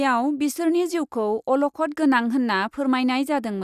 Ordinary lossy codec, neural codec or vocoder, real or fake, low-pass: Opus, 64 kbps; none; real; 14.4 kHz